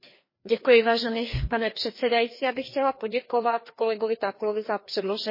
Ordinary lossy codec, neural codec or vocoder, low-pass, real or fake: MP3, 24 kbps; codec, 16 kHz, 2 kbps, FreqCodec, larger model; 5.4 kHz; fake